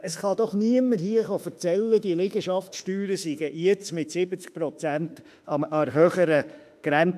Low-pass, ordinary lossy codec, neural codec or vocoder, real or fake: 14.4 kHz; none; autoencoder, 48 kHz, 32 numbers a frame, DAC-VAE, trained on Japanese speech; fake